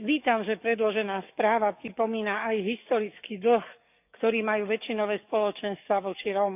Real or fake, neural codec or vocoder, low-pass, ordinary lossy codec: fake; codec, 44.1 kHz, 7.8 kbps, DAC; 3.6 kHz; none